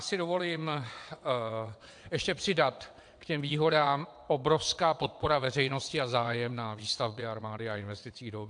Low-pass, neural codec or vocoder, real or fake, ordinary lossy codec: 9.9 kHz; vocoder, 22.05 kHz, 80 mel bands, WaveNeXt; fake; AAC, 64 kbps